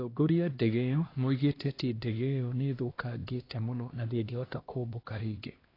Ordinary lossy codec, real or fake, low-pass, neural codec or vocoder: AAC, 24 kbps; fake; 5.4 kHz; codec, 16 kHz, 1 kbps, X-Codec, HuBERT features, trained on LibriSpeech